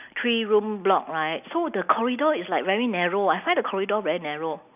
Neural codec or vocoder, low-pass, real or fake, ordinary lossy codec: none; 3.6 kHz; real; none